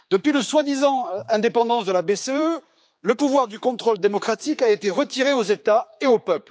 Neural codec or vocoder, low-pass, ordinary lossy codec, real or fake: codec, 16 kHz, 4 kbps, X-Codec, HuBERT features, trained on general audio; none; none; fake